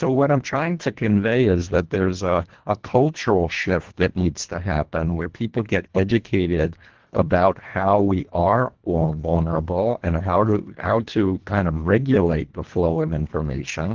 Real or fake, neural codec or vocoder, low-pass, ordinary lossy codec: fake; codec, 24 kHz, 1.5 kbps, HILCodec; 7.2 kHz; Opus, 16 kbps